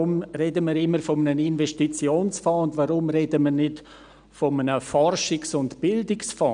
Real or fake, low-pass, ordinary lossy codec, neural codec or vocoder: real; 9.9 kHz; none; none